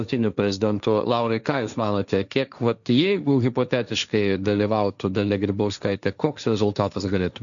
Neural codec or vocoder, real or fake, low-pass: codec, 16 kHz, 1.1 kbps, Voila-Tokenizer; fake; 7.2 kHz